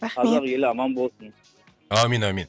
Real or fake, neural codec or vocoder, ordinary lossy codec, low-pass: real; none; none; none